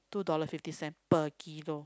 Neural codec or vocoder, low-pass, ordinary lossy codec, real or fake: none; none; none; real